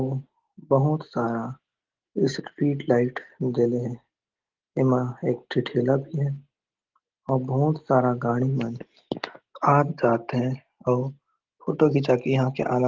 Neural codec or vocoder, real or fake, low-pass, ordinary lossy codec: none; real; 7.2 kHz; Opus, 16 kbps